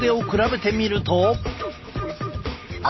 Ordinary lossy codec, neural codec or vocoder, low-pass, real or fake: MP3, 24 kbps; none; 7.2 kHz; real